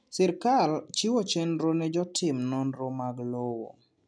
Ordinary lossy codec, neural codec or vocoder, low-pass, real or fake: none; none; 9.9 kHz; real